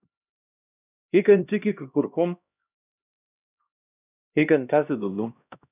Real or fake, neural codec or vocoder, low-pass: fake; codec, 16 kHz, 1 kbps, X-Codec, HuBERT features, trained on LibriSpeech; 3.6 kHz